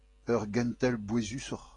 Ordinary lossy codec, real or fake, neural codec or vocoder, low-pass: AAC, 32 kbps; real; none; 10.8 kHz